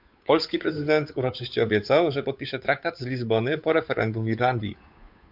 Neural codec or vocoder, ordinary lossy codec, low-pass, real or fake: codec, 16 kHz, 8 kbps, FunCodec, trained on Chinese and English, 25 frames a second; MP3, 48 kbps; 5.4 kHz; fake